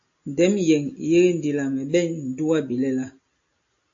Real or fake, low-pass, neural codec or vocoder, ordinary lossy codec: real; 7.2 kHz; none; AAC, 48 kbps